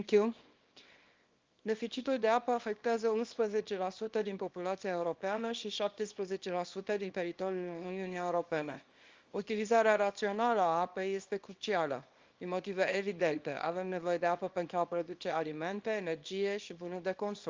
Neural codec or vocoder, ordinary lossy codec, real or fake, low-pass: codec, 24 kHz, 0.9 kbps, WavTokenizer, small release; Opus, 32 kbps; fake; 7.2 kHz